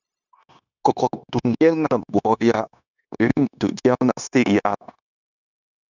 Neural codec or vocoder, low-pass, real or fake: codec, 16 kHz, 0.9 kbps, LongCat-Audio-Codec; 7.2 kHz; fake